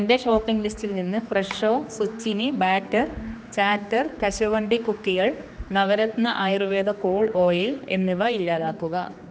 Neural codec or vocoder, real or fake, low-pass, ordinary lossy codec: codec, 16 kHz, 2 kbps, X-Codec, HuBERT features, trained on general audio; fake; none; none